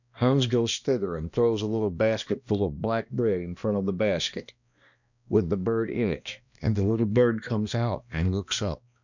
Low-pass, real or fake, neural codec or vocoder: 7.2 kHz; fake; codec, 16 kHz, 1 kbps, X-Codec, HuBERT features, trained on balanced general audio